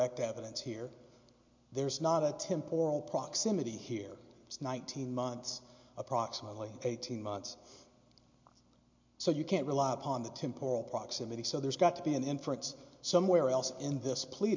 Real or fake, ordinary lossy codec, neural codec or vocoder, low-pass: real; MP3, 48 kbps; none; 7.2 kHz